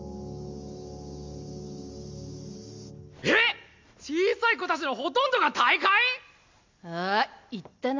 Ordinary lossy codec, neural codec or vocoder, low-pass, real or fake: MP3, 64 kbps; none; 7.2 kHz; real